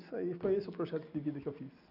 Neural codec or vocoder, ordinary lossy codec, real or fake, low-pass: none; none; real; 5.4 kHz